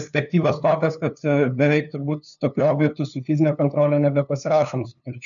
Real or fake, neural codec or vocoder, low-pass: fake; codec, 16 kHz, 2 kbps, FunCodec, trained on LibriTTS, 25 frames a second; 7.2 kHz